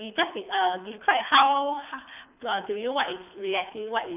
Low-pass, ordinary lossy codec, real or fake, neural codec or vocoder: 3.6 kHz; none; fake; codec, 24 kHz, 3 kbps, HILCodec